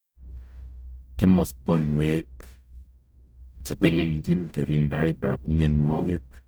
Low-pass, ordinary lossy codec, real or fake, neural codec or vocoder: none; none; fake; codec, 44.1 kHz, 0.9 kbps, DAC